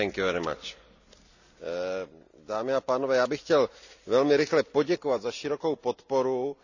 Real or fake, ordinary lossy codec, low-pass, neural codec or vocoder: real; none; 7.2 kHz; none